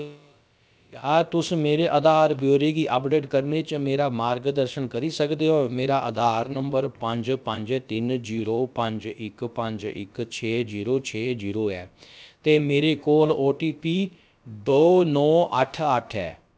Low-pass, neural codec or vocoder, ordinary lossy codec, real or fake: none; codec, 16 kHz, about 1 kbps, DyCAST, with the encoder's durations; none; fake